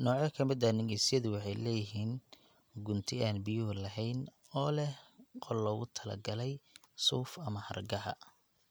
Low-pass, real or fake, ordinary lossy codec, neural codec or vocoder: none; real; none; none